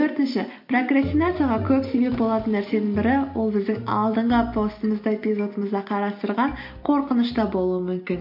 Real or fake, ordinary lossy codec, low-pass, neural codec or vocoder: real; MP3, 32 kbps; 5.4 kHz; none